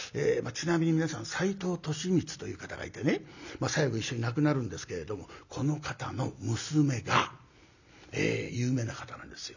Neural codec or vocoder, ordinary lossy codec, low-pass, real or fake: none; none; 7.2 kHz; real